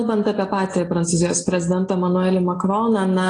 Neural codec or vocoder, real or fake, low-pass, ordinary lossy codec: none; real; 9.9 kHz; AAC, 32 kbps